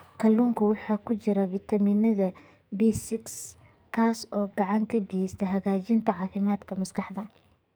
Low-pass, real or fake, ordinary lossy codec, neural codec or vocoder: none; fake; none; codec, 44.1 kHz, 2.6 kbps, SNAC